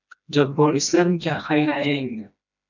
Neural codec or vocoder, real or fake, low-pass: codec, 16 kHz, 1 kbps, FreqCodec, smaller model; fake; 7.2 kHz